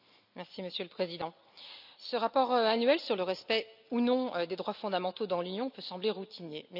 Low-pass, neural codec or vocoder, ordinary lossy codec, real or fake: 5.4 kHz; none; none; real